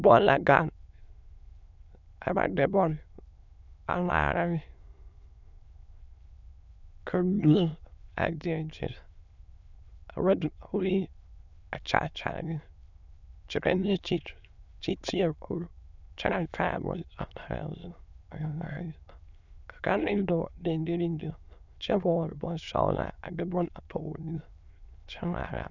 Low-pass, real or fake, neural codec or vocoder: 7.2 kHz; fake; autoencoder, 22.05 kHz, a latent of 192 numbers a frame, VITS, trained on many speakers